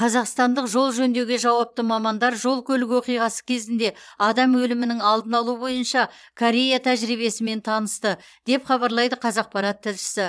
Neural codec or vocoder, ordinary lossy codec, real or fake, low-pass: vocoder, 22.05 kHz, 80 mel bands, Vocos; none; fake; none